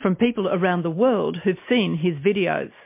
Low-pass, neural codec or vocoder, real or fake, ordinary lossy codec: 3.6 kHz; none; real; MP3, 24 kbps